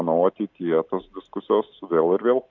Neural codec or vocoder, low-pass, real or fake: none; 7.2 kHz; real